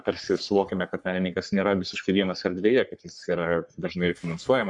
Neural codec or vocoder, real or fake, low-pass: codec, 44.1 kHz, 3.4 kbps, Pupu-Codec; fake; 10.8 kHz